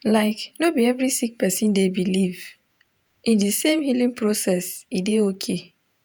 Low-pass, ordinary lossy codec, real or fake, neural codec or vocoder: none; none; fake; vocoder, 48 kHz, 128 mel bands, Vocos